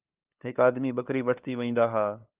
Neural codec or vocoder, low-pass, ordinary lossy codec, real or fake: codec, 16 kHz, 2 kbps, FunCodec, trained on LibriTTS, 25 frames a second; 3.6 kHz; Opus, 24 kbps; fake